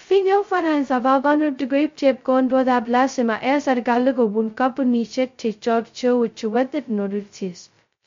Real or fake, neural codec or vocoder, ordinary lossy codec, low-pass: fake; codec, 16 kHz, 0.2 kbps, FocalCodec; MP3, 48 kbps; 7.2 kHz